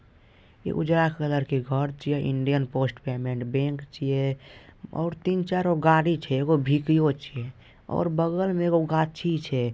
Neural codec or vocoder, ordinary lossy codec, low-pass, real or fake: none; none; none; real